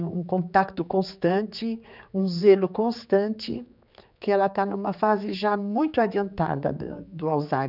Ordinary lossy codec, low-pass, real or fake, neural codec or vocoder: none; 5.4 kHz; fake; codec, 16 kHz, 4 kbps, X-Codec, HuBERT features, trained on general audio